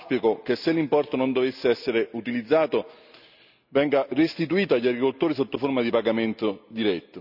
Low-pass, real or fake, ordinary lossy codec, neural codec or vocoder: 5.4 kHz; real; none; none